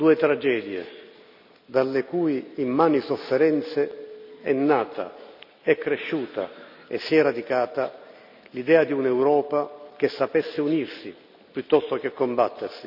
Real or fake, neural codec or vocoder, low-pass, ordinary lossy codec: real; none; 5.4 kHz; none